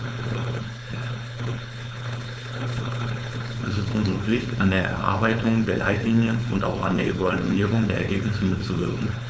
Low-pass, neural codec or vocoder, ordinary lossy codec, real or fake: none; codec, 16 kHz, 4.8 kbps, FACodec; none; fake